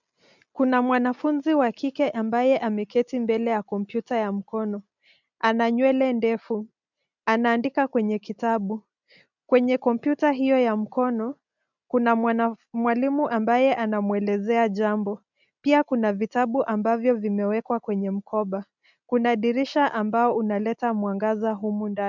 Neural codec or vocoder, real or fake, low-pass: none; real; 7.2 kHz